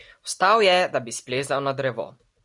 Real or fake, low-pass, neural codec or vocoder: real; 10.8 kHz; none